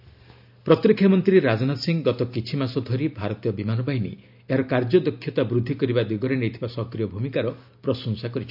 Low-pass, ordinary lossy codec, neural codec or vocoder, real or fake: 5.4 kHz; none; none; real